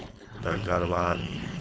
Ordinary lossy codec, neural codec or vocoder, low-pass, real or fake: none; codec, 16 kHz, 4.8 kbps, FACodec; none; fake